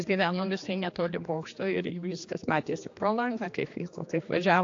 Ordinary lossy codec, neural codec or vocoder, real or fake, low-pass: AAC, 48 kbps; codec, 16 kHz, 2 kbps, X-Codec, HuBERT features, trained on general audio; fake; 7.2 kHz